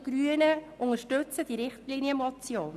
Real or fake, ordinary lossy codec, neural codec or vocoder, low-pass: real; none; none; 14.4 kHz